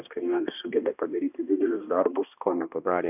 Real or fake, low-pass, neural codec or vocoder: fake; 3.6 kHz; codec, 16 kHz, 1 kbps, X-Codec, HuBERT features, trained on balanced general audio